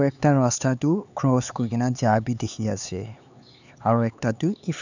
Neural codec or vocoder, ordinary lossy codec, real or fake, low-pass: codec, 16 kHz, 4 kbps, X-Codec, HuBERT features, trained on LibriSpeech; none; fake; 7.2 kHz